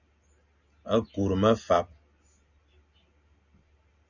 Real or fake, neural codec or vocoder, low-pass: real; none; 7.2 kHz